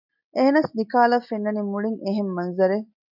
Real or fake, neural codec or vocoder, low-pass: real; none; 5.4 kHz